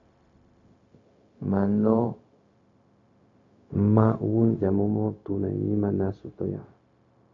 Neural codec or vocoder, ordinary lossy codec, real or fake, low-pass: codec, 16 kHz, 0.4 kbps, LongCat-Audio-Codec; AAC, 48 kbps; fake; 7.2 kHz